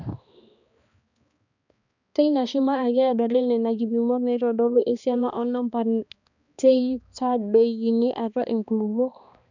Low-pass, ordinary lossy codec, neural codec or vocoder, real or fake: 7.2 kHz; none; codec, 16 kHz, 2 kbps, X-Codec, HuBERT features, trained on balanced general audio; fake